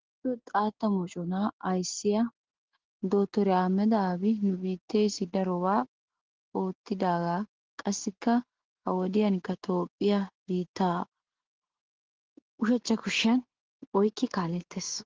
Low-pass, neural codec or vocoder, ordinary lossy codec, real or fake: 7.2 kHz; none; Opus, 16 kbps; real